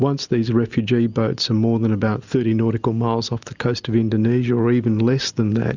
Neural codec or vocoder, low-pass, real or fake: none; 7.2 kHz; real